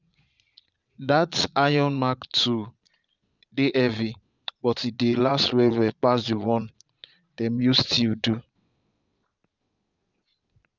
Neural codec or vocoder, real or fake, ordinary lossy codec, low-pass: vocoder, 22.05 kHz, 80 mel bands, Vocos; fake; none; 7.2 kHz